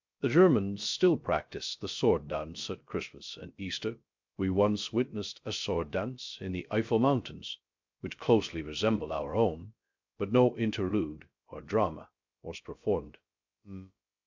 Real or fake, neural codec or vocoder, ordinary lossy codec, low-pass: fake; codec, 16 kHz, 0.3 kbps, FocalCodec; MP3, 64 kbps; 7.2 kHz